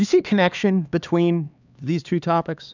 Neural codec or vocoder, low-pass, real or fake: codec, 16 kHz, 2 kbps, X-Codec, HuBERT features, trained on LibriSpeech; 7.2 kHz; fake